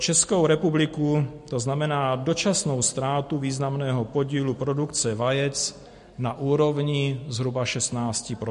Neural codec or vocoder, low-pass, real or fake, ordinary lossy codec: none; 14.4 kHz; real; MP3, 48 kbps